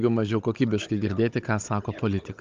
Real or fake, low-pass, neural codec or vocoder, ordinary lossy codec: fake; 7.2 kHz; codec, 16 kHz, 16 kbps, FunCodec, trained on Chinese and English, 50 frames a second; Opus, 32 kbps